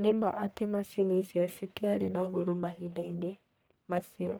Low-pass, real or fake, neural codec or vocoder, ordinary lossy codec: none; fake; codec, 44.1 kHz, 1.7 kbps, Pupu-Codec; none